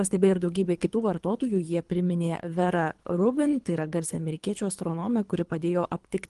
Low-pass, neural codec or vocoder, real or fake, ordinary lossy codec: 10.8 kHz; codec, 24 kHz, 3 kbps, HILCodec; fake; Opus, 24 kbps